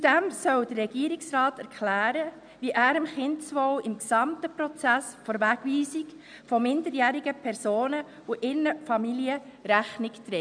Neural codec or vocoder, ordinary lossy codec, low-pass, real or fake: none; none; 9.9 kHz; real